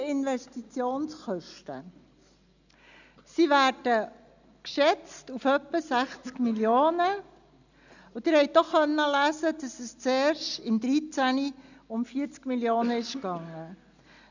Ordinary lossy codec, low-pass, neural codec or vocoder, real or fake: none; 7.2 kHz; none; real